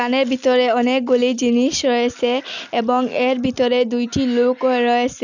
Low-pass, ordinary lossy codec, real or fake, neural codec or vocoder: 7.2 kHz; none; real; none